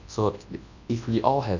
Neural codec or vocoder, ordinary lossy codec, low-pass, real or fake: codec, 24 kHz, 0.9 kbps, WavTokenizer, large speech release; none; 7.2 kHz; fake